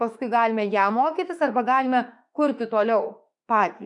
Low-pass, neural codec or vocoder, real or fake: 10.8 kHz; autoencoder, 48 kHz, 32 numbers a frame, DAC-VAE, trained on Japanese speech; fake